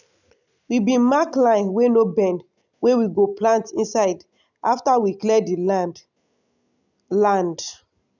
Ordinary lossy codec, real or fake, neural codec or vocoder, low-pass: none; real; none; 7.2 kHz